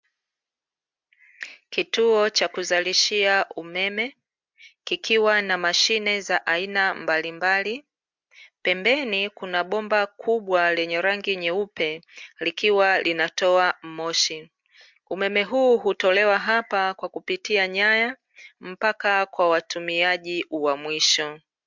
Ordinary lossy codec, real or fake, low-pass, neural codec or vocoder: MP3, 64 kbps; real; 7.2 kHz; none